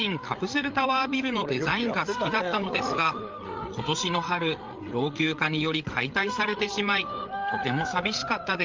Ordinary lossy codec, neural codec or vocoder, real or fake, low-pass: Opus, 32 kbps; codec, 16 kHz, 8 kbps, FreqCodec, larger model; fake; 7.2 kHz